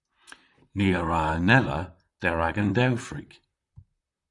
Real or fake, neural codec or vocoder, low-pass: fake; vocoder, 44.1 kHz, 128 mel bands, Pupu-Vocoder; 10.8 kHz